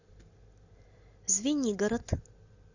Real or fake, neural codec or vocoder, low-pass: real; none; 7.2 kHz